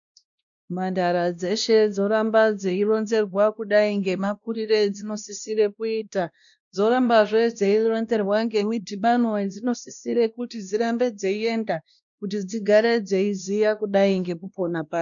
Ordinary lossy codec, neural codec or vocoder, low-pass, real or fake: MP3, 96 kbps; codec, 16 kHz, 1 kbps, X-Codec, WavLM features, trained on Multilingual LibriSpeech; 7.2 kHz; fake